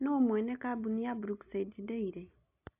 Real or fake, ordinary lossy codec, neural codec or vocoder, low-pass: real; AAC, 24 kbps; none; 3.6 kHz